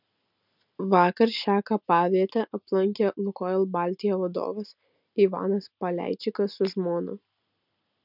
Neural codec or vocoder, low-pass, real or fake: none; 5.4 kHz; real